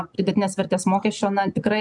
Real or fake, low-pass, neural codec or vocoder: real; 10.8 kHz; none